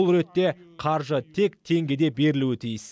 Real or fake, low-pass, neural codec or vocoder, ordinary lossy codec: real; none; none; none